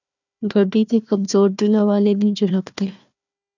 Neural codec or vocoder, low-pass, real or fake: codec, 16 kHz, 1 kbps, FunCodec, trained on Chinese and English, 50 frames a second; 7.2 kHz; fake